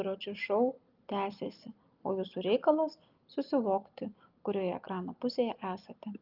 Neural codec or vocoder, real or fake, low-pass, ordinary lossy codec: none; real; 5.4 kHz; Opus, 24 kbps